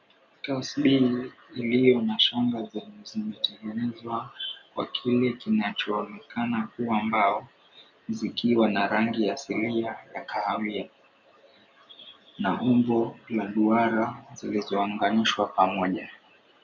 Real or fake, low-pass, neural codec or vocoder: real; 7.2 kHz; none